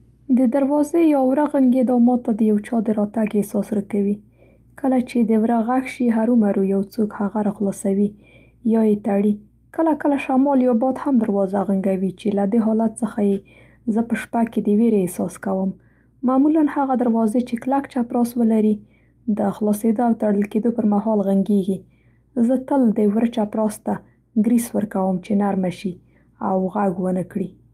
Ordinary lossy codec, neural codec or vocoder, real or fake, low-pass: Opus, 32 kbps; none; real; 14.4 kHz